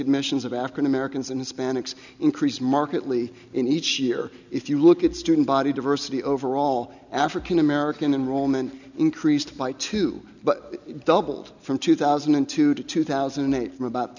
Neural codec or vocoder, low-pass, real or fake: none; 7.2 kHz; real